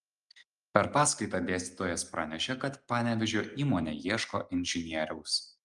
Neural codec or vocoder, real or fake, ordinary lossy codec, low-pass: none; real; Opus, 32 kbps; 10.8 kHz